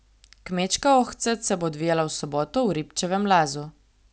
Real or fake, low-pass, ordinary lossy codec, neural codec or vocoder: real; none; none; none